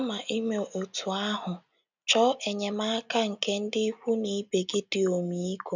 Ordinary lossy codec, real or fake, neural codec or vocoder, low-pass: none; real; none; 7.2 kHz